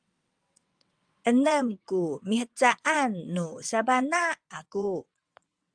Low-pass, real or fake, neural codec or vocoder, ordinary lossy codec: 9.9 kHz; fake; vocoder, 22.05 kHz, 80 mel bands, WaveNeXt; Opus, 32 kbps